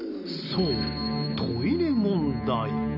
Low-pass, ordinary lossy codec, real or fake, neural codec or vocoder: 5.4 kHz; none; real; none